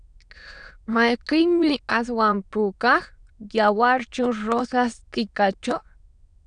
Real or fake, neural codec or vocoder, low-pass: fake; autoencoder, 22.05 kHz, a latent of 192 numbers a frame, VITS, trained on many speakers; 9.9 kHz